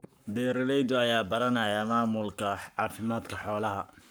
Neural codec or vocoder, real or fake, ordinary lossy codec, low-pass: codec, 44.1 kHz, 7.8 kbps, Pupu-Codec; fake; none; none